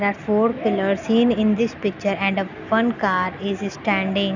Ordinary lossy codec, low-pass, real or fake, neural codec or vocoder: none; 7.2 kHz; real; none